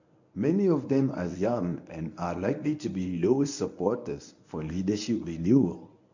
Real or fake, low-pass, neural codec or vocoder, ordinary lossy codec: fake; 7.2 kHz; codec, 24 kHz, 0.9 kbps, WavTokenizer, medium speech release version 1; none